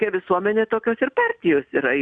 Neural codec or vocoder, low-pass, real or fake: none; 9.9 kHz; real